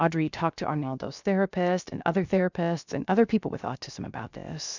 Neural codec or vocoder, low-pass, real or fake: codec, 16 kHz, 0.7 kbps, FocalCodec; 7.2 kHz; fake